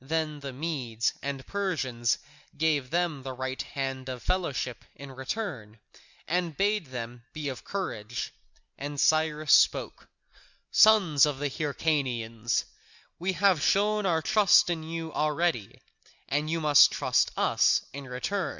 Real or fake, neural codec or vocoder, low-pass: real; none; 7.2 kHz